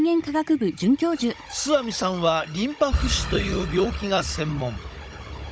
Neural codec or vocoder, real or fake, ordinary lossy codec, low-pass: codec, 16 kHz, 16 kbps, FunCodec, trained on Chinese and English, 50 frames a second; fake; none; none